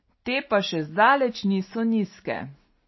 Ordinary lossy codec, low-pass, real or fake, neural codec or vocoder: MP3, 24 kbps; 7.2 kHz; real; none